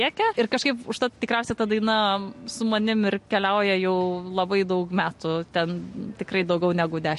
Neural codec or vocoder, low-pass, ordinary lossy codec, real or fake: none; 14.4 kHz; MP3, 48 kbps; real